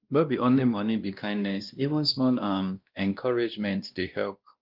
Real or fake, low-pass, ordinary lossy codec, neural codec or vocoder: fake; 5.4 kHz; Opus, 24 kbps; codec, 16 kHz, 1 kbps, X-Codec, WavLM features, trained on Multilingual LibriSpeech